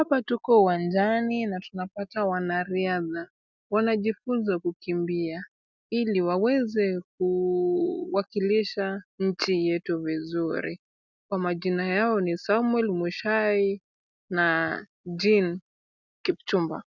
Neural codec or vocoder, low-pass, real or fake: none; 7.2 kHz; real